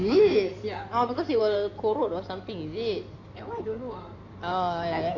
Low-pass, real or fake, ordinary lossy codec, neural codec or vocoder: 7.2 kHz; fake; none; codec, 16 kHz in and 24 kHz out, 2.2 kbps, FireRedTTS-2 codec